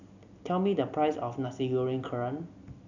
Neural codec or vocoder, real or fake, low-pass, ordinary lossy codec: none; real; 7.2 kHz; none